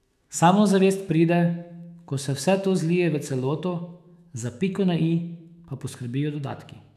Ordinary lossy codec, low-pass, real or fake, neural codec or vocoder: none; 14.4 kHz; fake; autoencoder, 48 kHz, 128 numbers a frame, DAC-VAE, trained on Japanese speech